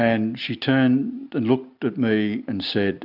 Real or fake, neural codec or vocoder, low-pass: real; none; 5.4 kHz